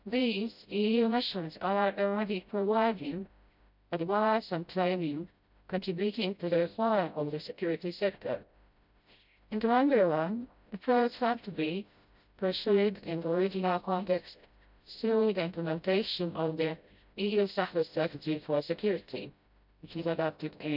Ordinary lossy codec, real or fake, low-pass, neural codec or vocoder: none; fake; 5.4 kHz; codec, 16 kHz, 0.5 kbps, FreqCodec, smaller model